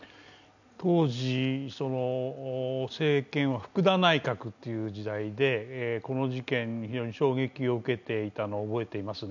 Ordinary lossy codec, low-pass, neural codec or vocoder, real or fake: none; 7.2 kHz; none; real